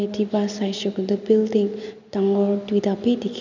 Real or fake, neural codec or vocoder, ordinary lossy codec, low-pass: real; none; none; 7.2 kHz